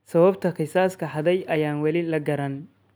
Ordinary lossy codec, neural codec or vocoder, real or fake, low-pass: none; none; real; none